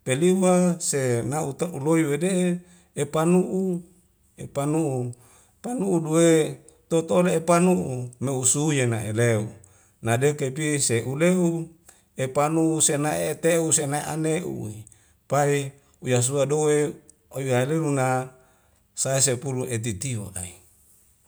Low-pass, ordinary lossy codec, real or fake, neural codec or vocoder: none; none; real; none